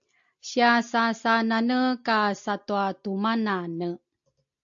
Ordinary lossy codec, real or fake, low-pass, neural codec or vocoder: AAC, 64 kbps; real; 7.2 kHz; none